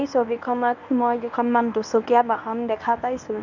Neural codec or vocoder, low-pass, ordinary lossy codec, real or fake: codec, 24 kHz, 0.9 kbps, WavTokenizer, medium speech release version 1; 7.2 kHz; none; fake